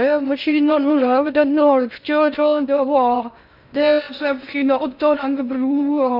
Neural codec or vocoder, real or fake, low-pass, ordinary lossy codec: codec, 16 kHz in and 24 kHz out, 0.6 kbps, FocalCodec, streaming, 2048 codes; fake; 5.4 kHz; none